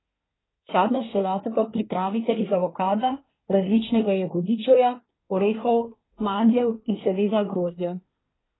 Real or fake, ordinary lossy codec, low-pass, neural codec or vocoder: fake; AAC, 16 kbps; 7.2 kHz; codec, 24 kHz, 1 kbps, SNAC